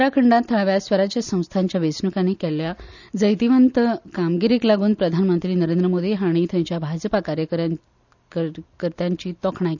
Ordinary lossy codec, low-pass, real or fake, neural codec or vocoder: none; 7.2 kHz; real; none